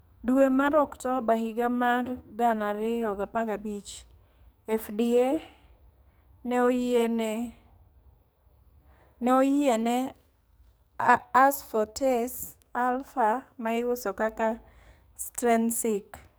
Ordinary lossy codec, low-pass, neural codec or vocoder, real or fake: none; none; codec, 44.1 kHz, 2.6 kbps, SNAC; fake